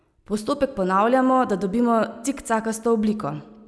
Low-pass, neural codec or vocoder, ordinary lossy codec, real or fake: none; none; none; real